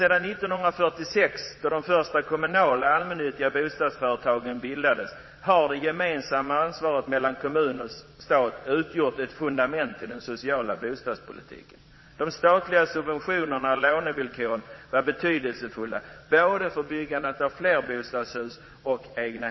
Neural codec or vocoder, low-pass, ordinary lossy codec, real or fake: vocoder, 22.05 kHz, 80 mel bands, Vocos; 7.2 kHz; MP3, 24 kbps; fake